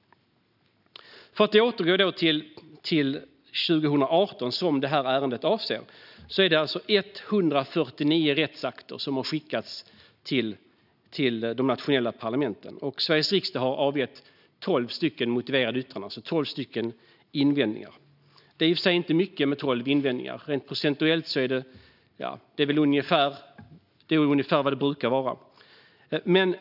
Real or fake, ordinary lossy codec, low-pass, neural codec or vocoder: real; none; 5.4 kHz; none